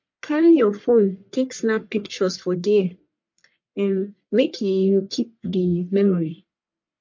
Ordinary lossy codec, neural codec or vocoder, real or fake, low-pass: MP3, 48 kbps; codec, 44.1 kHz, 1.7 kbps, Pupu-Codec; fake; 7.2 kHz